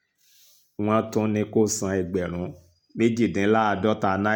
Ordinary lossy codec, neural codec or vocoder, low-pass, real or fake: none; none; 19.8 kHz; real